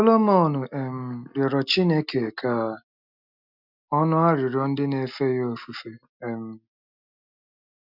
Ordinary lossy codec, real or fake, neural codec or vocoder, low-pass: none; real; none; 5.4 kHz